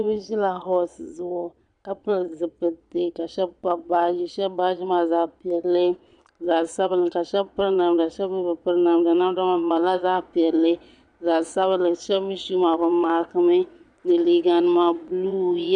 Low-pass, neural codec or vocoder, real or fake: 9.9 kHz; vocoder, 22.05 kHz, 80 mel bands, Vocos; fake